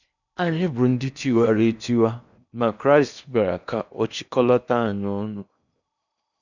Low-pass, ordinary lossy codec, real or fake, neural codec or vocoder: 7.2 kHz; none; fake; codec, 16 kHz in and 24 kHz out, 0.8 kbps, FocalCodec, streaming, 65536 codes